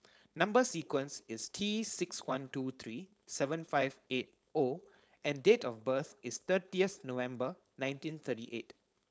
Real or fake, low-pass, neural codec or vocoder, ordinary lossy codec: fake; none; codec, 16 kHz, 4.8 kbps, FACodec; none